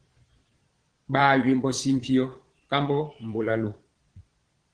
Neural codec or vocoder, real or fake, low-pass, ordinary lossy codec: vocoder, 22.05 kHz, 80 mel bands, Vocos; fake; 9.9 kHz; Opus, 16 kbps